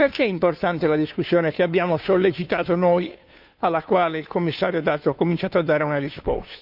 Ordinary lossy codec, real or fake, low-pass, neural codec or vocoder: none; fake; 5.4 kHz; codec, 16 kHz, 2 kbps, FunCodec, trained on Chinese and English, 25 frames a second